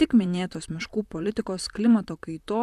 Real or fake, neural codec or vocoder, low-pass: fake; vocoder, 44.1 kHz, 128 mel bands, Pupu-Vocoder; 14.4 kHz